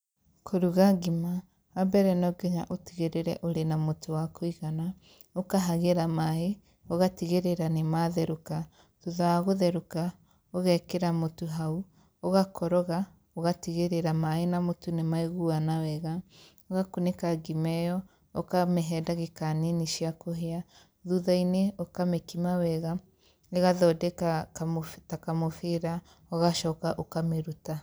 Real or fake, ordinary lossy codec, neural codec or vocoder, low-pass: real; none; none; none